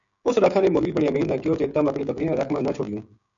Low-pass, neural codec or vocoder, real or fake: 7.2 kHz; codec, 16 kHz, 16 kbps, FreqCodec, smaller model; fake